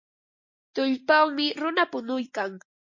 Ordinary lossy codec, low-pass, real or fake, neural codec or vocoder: MP3, 32 kbps; 7.2 kHz; real; none